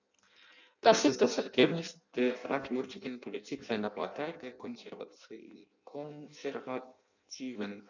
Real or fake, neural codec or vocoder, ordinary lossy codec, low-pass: fake; codec, 16 kHz in and 24 kHz out, 0.6 kbps, FireRedTTS-2 codec; none; 7.2 kHz